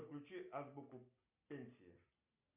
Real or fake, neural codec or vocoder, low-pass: real; none; 3.6 kHz